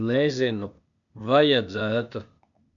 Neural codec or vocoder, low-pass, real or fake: codec, 16 kHz, 0.8 kbps, ZipCodec; 7.2 kHz; fake